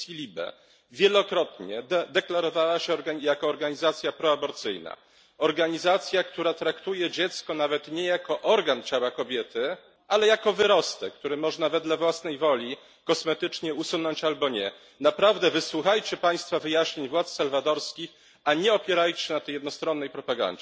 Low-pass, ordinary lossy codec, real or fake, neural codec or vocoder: none; none; real; none